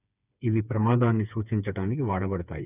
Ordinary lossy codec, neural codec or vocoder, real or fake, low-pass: none; codec, 16 kHz, 4 kbps, FreqCodec, smaller model; fake; 3.6 kHz